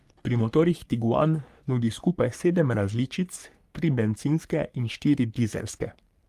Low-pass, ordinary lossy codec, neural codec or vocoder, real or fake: 14.4 kHz; Opus, 32 kbps; codec, 44.1 kHz, 3.4 kbps, Pupu-Codec; fake